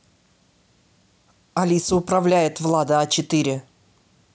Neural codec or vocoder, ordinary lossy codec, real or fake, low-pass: none; none; real; none